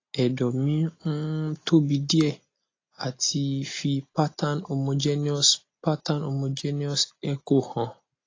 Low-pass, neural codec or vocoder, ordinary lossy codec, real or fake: 7.2 kHz; none; AAC, 32 kbps; real